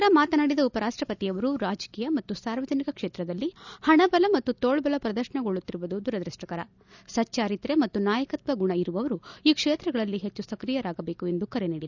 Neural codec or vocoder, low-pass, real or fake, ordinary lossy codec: none; 7.2 kHz; real; none